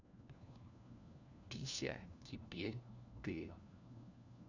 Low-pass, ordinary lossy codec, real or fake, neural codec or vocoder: 7.2 kHz; none; fake; codec, 16 kHz, 1 kbps, FunCodec, trained on LibriTTS, 50 frames a second